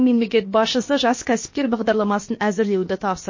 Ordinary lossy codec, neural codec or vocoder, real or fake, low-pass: MP3, 32 kbps; codec, 16 kHz, about 1 kbps, DyCAST, with the encoder's durations; fake; 7.2 kHz